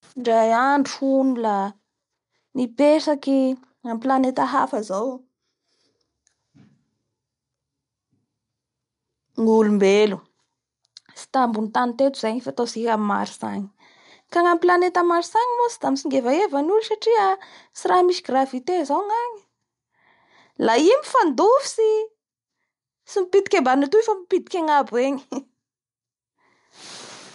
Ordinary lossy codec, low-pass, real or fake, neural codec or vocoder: MP3, 64 kbps; 10.8 kHz; real; none